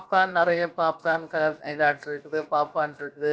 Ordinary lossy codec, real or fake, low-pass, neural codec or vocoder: none; fake; none; codec, 16 kHz, about 1 kbps, DyCAST, with the encoder's durations